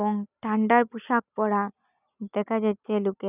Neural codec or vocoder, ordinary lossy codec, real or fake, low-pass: none; none; real; 3.6 kHz